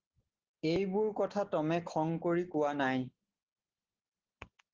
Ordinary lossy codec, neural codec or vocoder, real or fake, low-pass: Opus, 16 kbps; none; real; 7.2 kHz